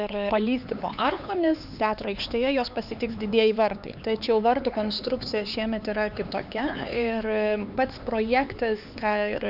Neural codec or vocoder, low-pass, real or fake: codec, 16 kHz, 4 kbps, X-Codec, HuBERT features, trained on LibriSpeech; 5.4 kHz; fake